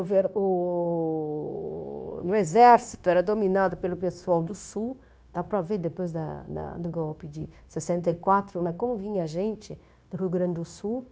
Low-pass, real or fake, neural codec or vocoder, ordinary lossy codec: none; fake; codec, 16 kHz, 0.9 kbps, LongCat-Audio-Codec; none